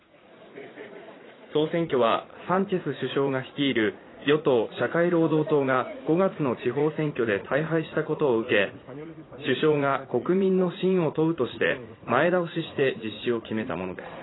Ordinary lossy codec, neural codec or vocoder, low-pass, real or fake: AAC, 16 kbps; vocoder, 44.1 kHz, 80 mel bands, Vocos; 7.2 kHz; fake